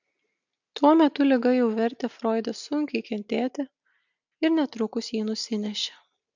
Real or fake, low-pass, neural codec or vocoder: real; 7.2 kHz; none